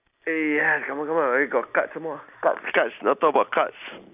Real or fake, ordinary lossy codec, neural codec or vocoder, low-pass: real; none; none; 3.6 kHz